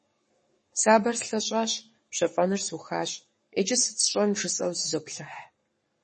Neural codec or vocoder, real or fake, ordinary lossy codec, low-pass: codec, 44.1 kHz, 7.8 kbps, Pupu-Codec; fake; MP3, 32 kbps; 10.8 kHz